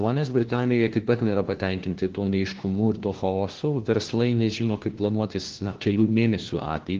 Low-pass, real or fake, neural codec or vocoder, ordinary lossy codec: 7.2 kHz; fake; codec, 16 kHz, 1 kbps, FunCodec, trained on LibriTTS, 50 frames a second; Opus, 16 kbps